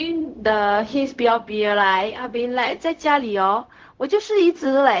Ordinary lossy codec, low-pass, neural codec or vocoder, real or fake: Opus, 16 kbps; 7.2 kHz; codec, 16 kHz, 0.4 kbps, LongCat-Audio-Codec; fake